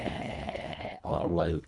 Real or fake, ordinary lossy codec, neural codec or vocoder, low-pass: fake; none; codec, 24 kHz, 1.5 kbps, HILCodec; 10.8 kHz